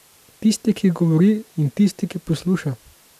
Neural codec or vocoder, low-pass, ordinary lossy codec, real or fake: none; 14.4 kHz; none; real